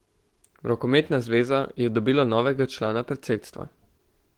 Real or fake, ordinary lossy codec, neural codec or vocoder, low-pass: fake; Opus, 16 kbps; codec, 44.1 kHz, 7.8 kbps, DAC; 19.8 kHz